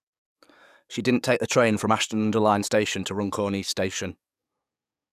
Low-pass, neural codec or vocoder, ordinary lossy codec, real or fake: 14.4 kHz; codec, 44.1 kHz, 7.8 kbps, DAC; none; fake